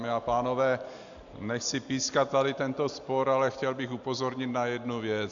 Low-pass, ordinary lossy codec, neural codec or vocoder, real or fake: 7.2 kHz; AAC, 64 kbps; none; real